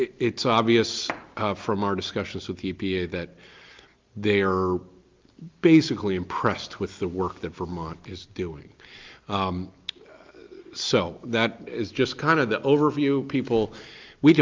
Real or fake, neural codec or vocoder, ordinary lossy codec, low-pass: real; none; Opus, 24 kbps; 7.2 kHz